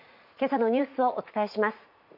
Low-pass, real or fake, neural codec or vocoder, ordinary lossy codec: 5.4 kHz; fake; vocoder, 44.1 kHz, 80 mel bands, Vocos; none